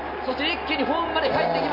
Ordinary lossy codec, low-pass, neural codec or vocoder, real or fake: none; 5.4 kHz; none; real